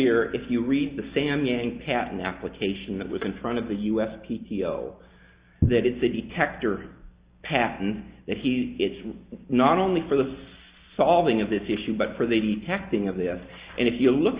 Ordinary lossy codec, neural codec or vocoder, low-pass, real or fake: Opus, 64 kbps; none; 3.6 kHz; real